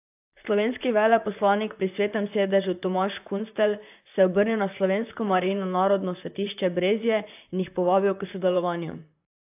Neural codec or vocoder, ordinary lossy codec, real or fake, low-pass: vocoder, 44.1 kHz, 128 mel bands, Pupu-Vocoder; AAC, 32 kbps; fake; 3.6 kHz